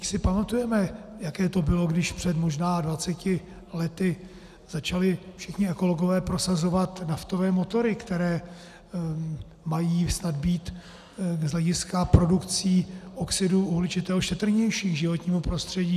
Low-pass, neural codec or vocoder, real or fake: 14.4 kHz; none; real